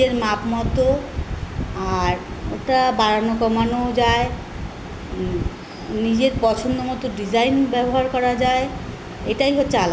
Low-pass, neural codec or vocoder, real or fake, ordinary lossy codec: none; none; real; none